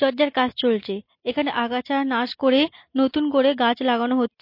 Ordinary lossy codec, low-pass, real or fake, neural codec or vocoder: MP3, 32 kbps; 5.4 kHz; real; none